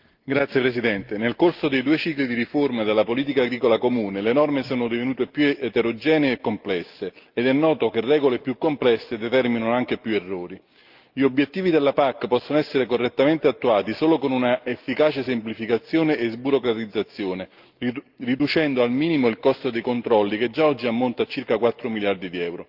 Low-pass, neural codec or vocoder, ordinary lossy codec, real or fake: 5.4 kHz; none; Opus, 32 kbps; real